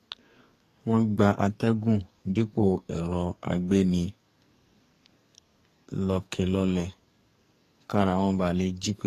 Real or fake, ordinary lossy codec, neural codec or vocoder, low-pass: fake; AAC, 48 kbps; codec, 44.1 kHz, 2.6 kbps, SNAC; 14.4 kHz